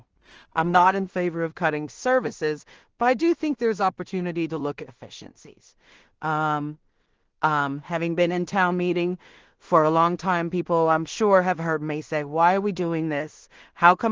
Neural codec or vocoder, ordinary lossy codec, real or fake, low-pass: codec, 16 kHz in and 24 kHz out, 0.4 kbps, LongCat-Audio-Codec, two codebook decoder; Opus, 16 kbps; fake; 7.2 kHz